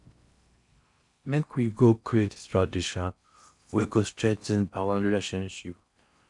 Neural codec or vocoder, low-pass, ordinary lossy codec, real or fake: codec, 16 kHz in and 24 kHz out, 0.6 kbps, FocalCodec, streaming, 4096 codes; 10.8 kHz; none; fake